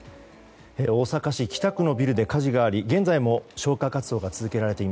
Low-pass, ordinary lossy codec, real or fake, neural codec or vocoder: none; none; real; none